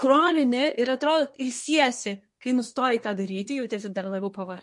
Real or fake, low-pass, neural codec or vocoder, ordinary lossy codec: fake; 10.8 kHz; codec, 24 kHz, 1 kbps, SNAC; MP3, 48 kbps